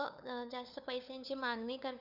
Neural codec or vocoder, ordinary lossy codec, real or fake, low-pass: codec, 16 kHz, 8 kbps, FunCodec, trained on LibriTTS, 25 frames a second; none; fake; 5.4 kHz